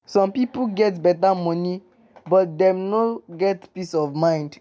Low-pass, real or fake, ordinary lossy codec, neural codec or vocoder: none; real; none; none